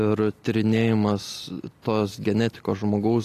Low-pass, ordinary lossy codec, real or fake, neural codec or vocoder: 14.4 kHz; AAC, 48 kbps; real; none